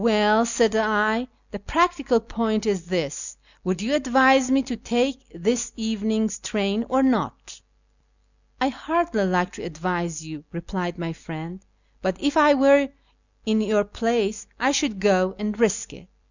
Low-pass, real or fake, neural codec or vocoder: 7.2 kHz; real; none